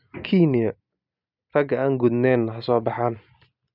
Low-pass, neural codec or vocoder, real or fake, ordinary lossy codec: 5.4 kHz; none; real; none